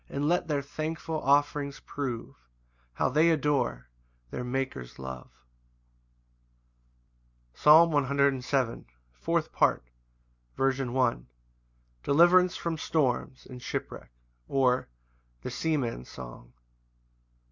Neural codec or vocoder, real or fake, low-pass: none; real; 7.2 kHz